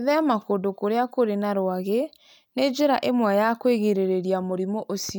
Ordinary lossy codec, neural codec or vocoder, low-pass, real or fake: none; none; none; real